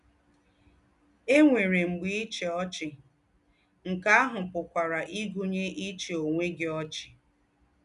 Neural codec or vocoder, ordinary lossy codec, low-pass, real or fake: none; none; 10.8 kHz; real